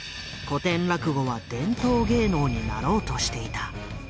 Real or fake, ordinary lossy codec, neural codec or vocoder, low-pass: real; none; none; none